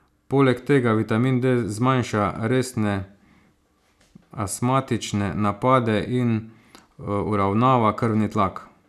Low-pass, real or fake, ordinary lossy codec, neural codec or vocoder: 14.4 kHz; real; none; none